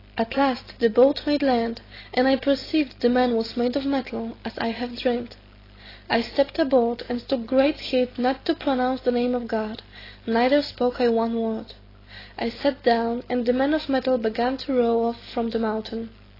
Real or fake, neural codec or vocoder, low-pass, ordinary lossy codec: real; none; 5.4 kHz; AAC, 24 kbps